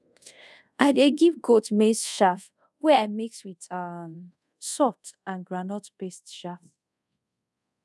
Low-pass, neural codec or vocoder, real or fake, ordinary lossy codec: none; codec, 24 kHz, 0.5 kbps, DualCodec; fake; none